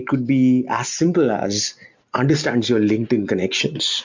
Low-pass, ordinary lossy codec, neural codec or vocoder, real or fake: 7.2 kHz; MP3, 64 kbps; none; real